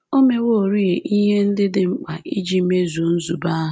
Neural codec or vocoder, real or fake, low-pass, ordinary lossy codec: none; real; none; none